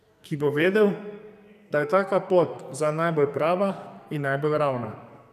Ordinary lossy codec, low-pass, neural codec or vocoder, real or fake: none; 14.4 kHz; codec, 44.1 kHz, 2.6 kbps, SNAC; fake